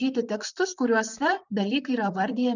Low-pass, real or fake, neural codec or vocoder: 7.2 kHz; fake; vocoder, 44.1 kHz, 128 mel bands, Pupu-Vocoder